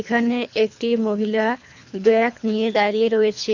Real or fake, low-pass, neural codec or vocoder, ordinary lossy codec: fake; 7.2 kHz; codec, 24 kHz, 3 kbps, HILCodec; none